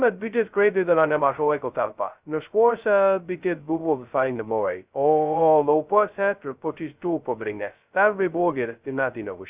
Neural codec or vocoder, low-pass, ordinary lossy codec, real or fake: codec, 16 kHz, 0.2 kbps, FocalCodec; 3.6 kHz; Opus, 64 kbps; fake